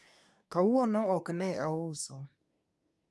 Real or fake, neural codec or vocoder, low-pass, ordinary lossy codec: fake; codec, 24 kHz, 1 kbps, SNAC; none; none